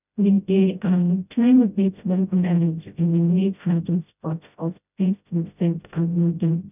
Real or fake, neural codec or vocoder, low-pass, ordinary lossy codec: fake; codec, 16 kHz, 0.5 kbps, FreqCodec, smaller model; 3.6 kHz; none